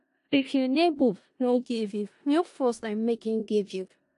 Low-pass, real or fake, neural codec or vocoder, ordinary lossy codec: 10.8 kHz; fake; codec, 16 kHz in and 24 kHz out, 0.4 kbps, LongCat-Audio-Codec, four codebook decoder; AAC, 48 kbps